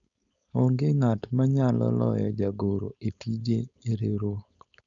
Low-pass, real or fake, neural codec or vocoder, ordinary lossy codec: 7.2 kHz; fake; codec, 16 kHz, 4.8 kbps, FACodec; none